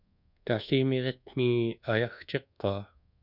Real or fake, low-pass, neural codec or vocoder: fake; 5.4 kHz; codec, 24 kHz, 1.2 kbps, DualCodec